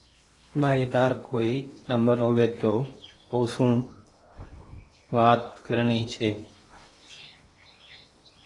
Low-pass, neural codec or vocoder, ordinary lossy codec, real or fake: 10.8 kHz; codec, 16 kHz in and 24 kHz out, 0.8 kbps, FocalCodec, streaming, 65536 codes; AAC, 32 kbps; fake